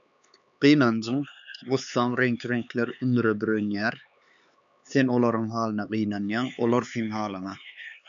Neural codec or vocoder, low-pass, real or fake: codec, 16 kHz, 4 kbps, X-Codec, HuBERT features, trained on LibriSpeech; 7.2 kHz; fake